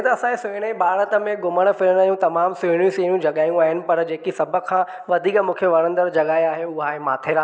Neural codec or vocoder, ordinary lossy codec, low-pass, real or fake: none; none; none; real